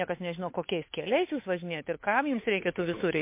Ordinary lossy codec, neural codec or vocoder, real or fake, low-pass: MP3, 24 kbps; codec, 16 kHz, 8 kbps, FunCodec, trained on Chinese and English, 25 frames a second; fake; 3.6 kHz